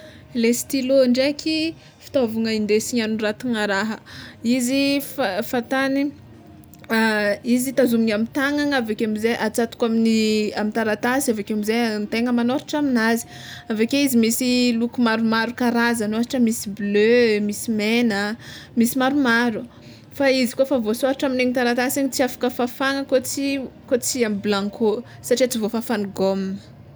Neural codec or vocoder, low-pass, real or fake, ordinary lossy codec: none; none; real; none